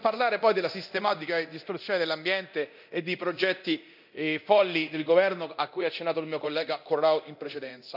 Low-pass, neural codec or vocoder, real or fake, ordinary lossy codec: 5.4 kHz; codec, 24 kHz, 0.9 kbps, DualCodec; fake; none